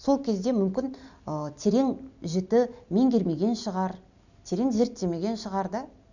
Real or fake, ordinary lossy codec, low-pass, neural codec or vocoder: real; none; 7.2 kHz; none